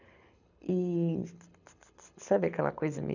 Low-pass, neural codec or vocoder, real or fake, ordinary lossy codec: 7.2 kHz; codec, 24 kHz, 6 kbps, HILCodec; fake; none